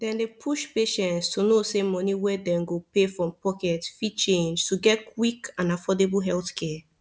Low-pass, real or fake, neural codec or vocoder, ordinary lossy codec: none; real; none; none